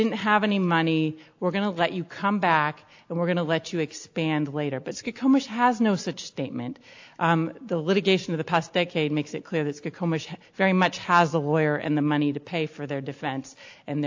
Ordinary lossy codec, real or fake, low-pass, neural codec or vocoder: AAC, 48 kbps; real; 7.2 kHz; none